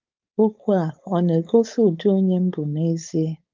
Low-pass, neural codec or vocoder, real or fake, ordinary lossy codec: 7.2 kHz; codec, 16 kHz, 4.8 kbps, FACodec; fake; Opus, 24 kbps